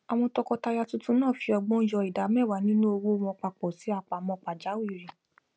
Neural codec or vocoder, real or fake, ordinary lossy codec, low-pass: none; real; none; none